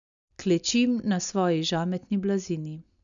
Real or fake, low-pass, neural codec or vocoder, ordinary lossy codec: real; 7.2 kHz; none; none